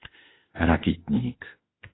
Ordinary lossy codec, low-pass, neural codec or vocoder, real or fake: AAC, 16 kbps; 7.2 kHz; autoencoder, 48 kHz, 32 numbers a frame, DAC-VAE, trained on Japanese speech; fake